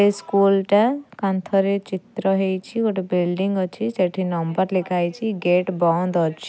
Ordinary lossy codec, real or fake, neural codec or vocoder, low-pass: none; real; none; none